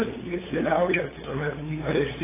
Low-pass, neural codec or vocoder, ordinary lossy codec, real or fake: 3.6 kHz; codec, 16 kHz, 8 kbps, FunCodec, trained on LibriTTS, 25 frames a second; AAC, 16 kbps; fake